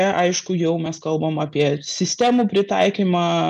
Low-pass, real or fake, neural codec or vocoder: 10.8 kHz; real; none